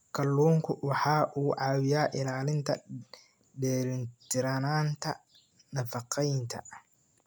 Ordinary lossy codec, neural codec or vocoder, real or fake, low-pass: none; none; real; none